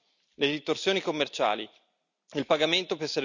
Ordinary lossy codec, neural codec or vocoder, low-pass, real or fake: none; none; 7.2 kHz; real